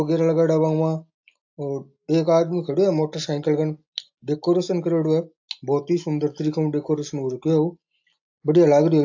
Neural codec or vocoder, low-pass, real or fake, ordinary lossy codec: none; 7.2 kHz; real; AAC, 48 kbps